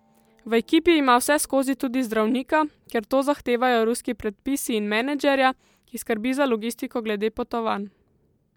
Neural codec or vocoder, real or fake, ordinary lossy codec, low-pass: none; real; MP3, 96 kbps; 19.8 kHz